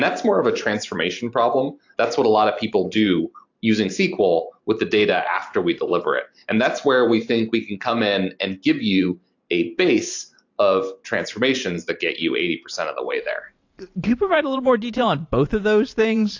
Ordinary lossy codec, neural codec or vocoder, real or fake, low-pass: AAC, 48 kbps; vocoder, 44.1 kHz, 128 mel bands every 512 samples, BigVGAN v2; fake; 7.2 kHz